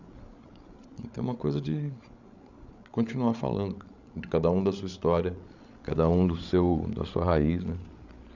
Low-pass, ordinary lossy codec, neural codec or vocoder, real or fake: 7.2 kHz; none; codec, 16 kHz, 8 kbps, FreqCodec, larger model; fake